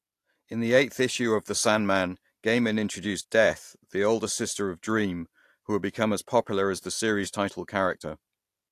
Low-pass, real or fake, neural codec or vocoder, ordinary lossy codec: 14.4 kHz; real; none; AAC, 64 kbps